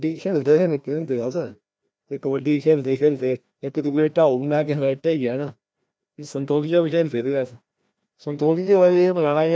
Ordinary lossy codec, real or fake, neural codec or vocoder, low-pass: none; fake; codec, 16 kHz, 1 kbps, FreqCodec, larger model; none